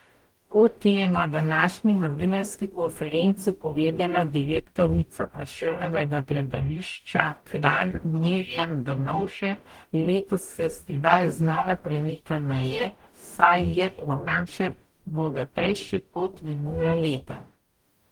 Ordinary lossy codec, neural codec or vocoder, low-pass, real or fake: Opus, 24 kbps; codec, 44.1 kHz, 0.9 kbps, DAC; 19.8 kHz; fake